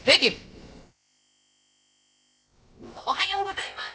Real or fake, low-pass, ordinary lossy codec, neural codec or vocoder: fake; none; none; codec, 16 kHz, about 1 kbps, DyCAST, with the encoder's durations